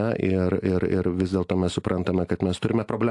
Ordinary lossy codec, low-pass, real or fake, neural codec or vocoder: AAC, 64 kbps; 10.8 kHz; real; none